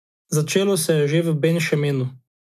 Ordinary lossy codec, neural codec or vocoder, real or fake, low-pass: none; none; real; 14.4 kHz